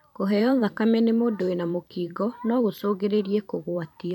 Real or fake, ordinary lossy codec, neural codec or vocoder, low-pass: fake; none; vocoder, 44.1 kHz, 128 mel bands every 512 samples, BigVGAN v2; 19.8 kHz